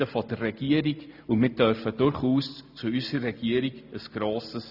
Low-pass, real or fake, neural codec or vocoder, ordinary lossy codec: 5.4 kHz; real; none; none